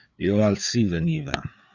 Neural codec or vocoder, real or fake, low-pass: codec, 16 kHz, 4 kbps, FreqCodec, larger model; fake; 7.2 kHz